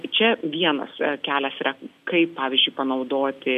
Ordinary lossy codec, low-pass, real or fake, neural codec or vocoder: AAC, 96 kbps; 14.4 kHz; real; none